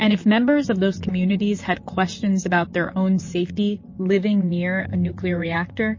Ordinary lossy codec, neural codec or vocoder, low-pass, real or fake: MP3, 32 kbps; vocoder, 44.1 kHz, 128 mel bands, Pupu-Vocoder; 7.2 kHz; fake